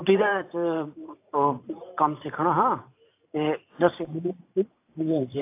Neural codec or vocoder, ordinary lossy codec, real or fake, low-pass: vocoder, 44.1 kHz, 128 mel bands every 512 samples, BigVGAN v2; AAC, 24 kbps; fake; 3.6 kHz